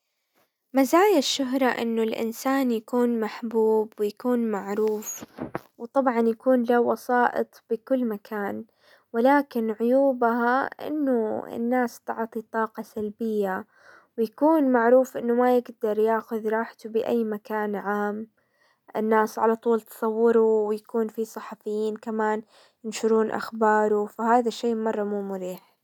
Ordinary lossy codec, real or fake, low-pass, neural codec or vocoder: none; real; 19.8 kHz; none